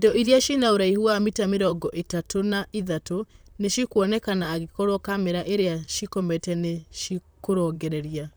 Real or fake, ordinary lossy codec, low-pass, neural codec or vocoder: fake; none; none; vocoder, 44.1 kHz, 128 mel bands, Pupu-Vocoder